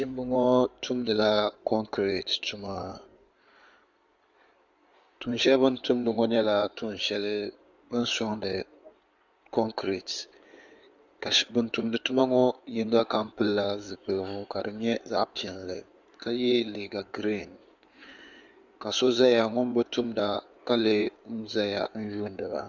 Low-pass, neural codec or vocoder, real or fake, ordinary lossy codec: 7.2 kHz; codec, 16 kHz in and 24 kHz out, 2.2 kbps, FireRedTTS-2 codec; fake; Opus, 64 kbps